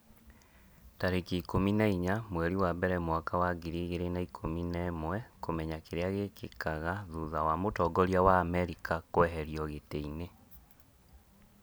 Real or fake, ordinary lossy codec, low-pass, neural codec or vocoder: real; none; none; none